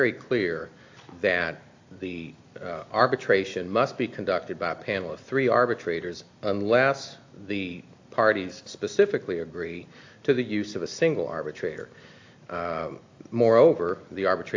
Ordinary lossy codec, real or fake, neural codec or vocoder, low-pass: AAC, 48 kbps; real; none; 7.2 kHz